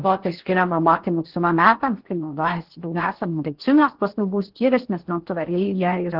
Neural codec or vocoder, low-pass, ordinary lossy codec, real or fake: codec, 16 kHz in and 24 kHz out, 0.6 kbps, FocalCodec, streaming, 4096 codes; 5.4 kHz; Opus, 16 kbps; fake